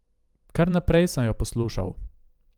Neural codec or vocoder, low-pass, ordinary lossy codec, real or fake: vocoder, 44.1 kHz, 128 mel bands every 512 samples, BigVGAN v2; 19.8 kHz; Opus, 32 kbps; fake